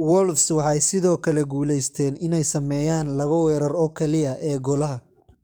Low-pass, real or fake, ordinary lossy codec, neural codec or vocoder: none; fake; none; codec, 44.1 kHz, 7.8 kbps, DAC